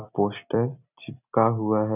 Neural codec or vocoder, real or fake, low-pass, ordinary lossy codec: vocoder, 44.1 kHz, 128 mel bands every 256 samples, BigVGAN v2; fake; 3.6 kHz; none